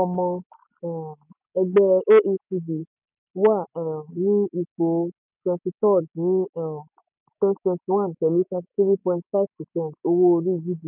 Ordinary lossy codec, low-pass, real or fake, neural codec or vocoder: none; 3.6 kHz; real; none